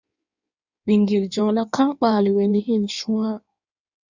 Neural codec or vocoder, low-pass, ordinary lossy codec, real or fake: codec, 16 kHz in and 24 kHz out, 1.1 kbps, FireRedTTS-2 codec; 7.2 kHz; Opus, 64 kbps; fake